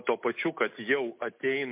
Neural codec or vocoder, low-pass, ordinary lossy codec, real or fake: none; 3.6 kHz; MP3, 32 kbps; real